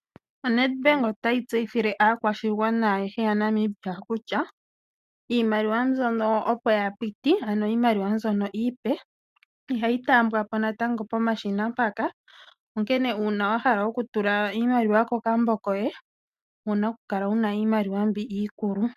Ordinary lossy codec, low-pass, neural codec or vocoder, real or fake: MP3, 96 kbps; 14.4 kHz; none; real